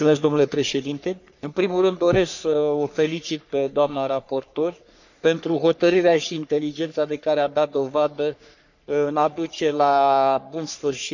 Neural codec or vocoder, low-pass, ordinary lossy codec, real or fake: codec, 44.1 kHz, 3.4 kbps, Pupu-Codec; 7.2 kHz; none; fake